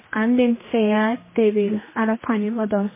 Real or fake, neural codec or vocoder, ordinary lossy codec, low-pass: fake; codec, 16 kHz, 1 kbps, X-Codec, HuBERT features, trained on general audio; MP3, 16 kbps; 3.6 kHz